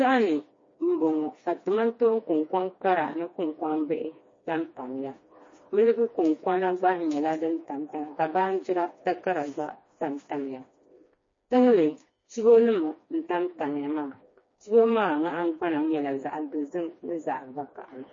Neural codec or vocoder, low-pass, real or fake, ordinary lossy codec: codec, 16 kHz, 2 kbps, FreqCodec, smaller model; 7.2 kHz; fake; MP3, 32 kbps